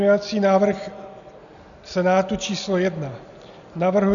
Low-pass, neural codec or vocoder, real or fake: 7.2 kHz; none; real